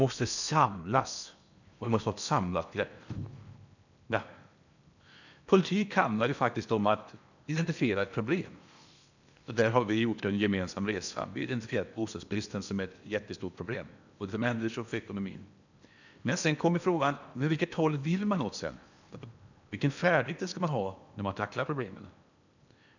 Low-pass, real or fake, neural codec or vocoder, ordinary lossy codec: 7.2 kHz; fake; codec, 16 kHz in and 24 kHz out, 0.8 kbps, FocalCodec, streaming, 65536 codes; none